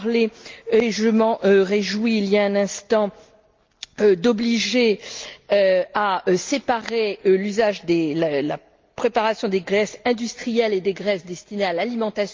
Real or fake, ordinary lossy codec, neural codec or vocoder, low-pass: real; Opus, 32 kbps; none; 7.2 kHz